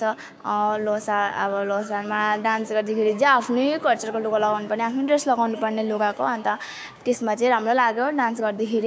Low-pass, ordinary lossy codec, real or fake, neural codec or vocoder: none; none; fake; codec, 16 kHz, 6 kbps, DAC